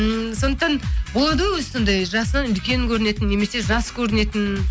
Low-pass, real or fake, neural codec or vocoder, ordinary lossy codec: none; real; none; none